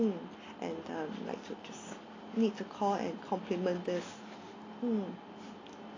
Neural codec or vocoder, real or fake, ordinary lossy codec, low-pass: none; real; AAC, 32 kbps; 7.2 kHz